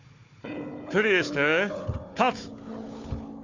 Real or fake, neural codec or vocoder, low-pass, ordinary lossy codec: fake; codec, 16 kHz, 4 kbps, FunCodec, trained on Chinese and English, 50 frames a second; 7.2 kHz; MP3, 64 kbps